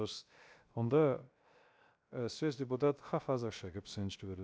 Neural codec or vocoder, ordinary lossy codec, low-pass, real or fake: codec, 16 kHz, 0.3 kbps, FocalCodec; none; none; fake